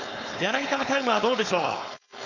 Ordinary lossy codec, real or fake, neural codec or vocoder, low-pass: none; fake; codec, 16 kHz, 4.8 kbps, FACodec; 7.2 kHz